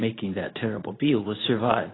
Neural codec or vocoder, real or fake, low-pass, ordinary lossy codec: codec, 24 kHz, 0.9 kbps, WavTokenizer, medium speech release version 2; fake; 7.2 kHz; AAC, 16 kbps